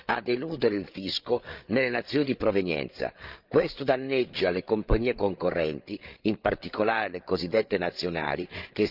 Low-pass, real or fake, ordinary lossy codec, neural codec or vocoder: 5.4 kHz; fake; Opus, 24 kbps; codec, 16 kHz, 8 kbps, FreqCodec, larger model